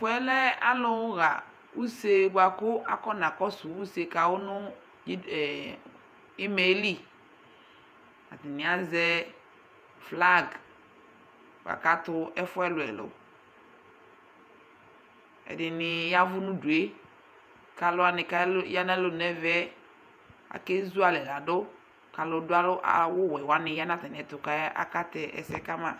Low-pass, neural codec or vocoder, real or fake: 14.4 kHz; vocoder, 48 kHz, 128 mel bands, Vocos; fake